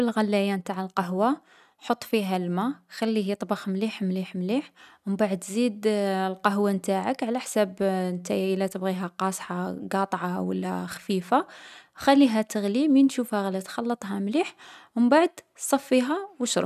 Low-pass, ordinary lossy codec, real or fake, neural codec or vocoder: 14.4 kHz; none; real; none